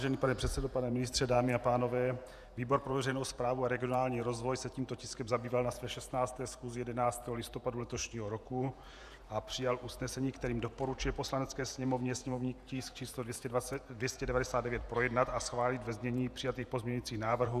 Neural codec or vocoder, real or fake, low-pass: vocoder, 48 kHz, 128 mel bands, Vocos; fake; 14.4 kHz